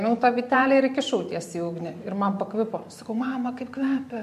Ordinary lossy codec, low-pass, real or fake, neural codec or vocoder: MP3, 64 kbps; 14.4 kHz; fake; vocoder, 44.1 kHz, 128 mel bands every 512 samples, BigVGAN v2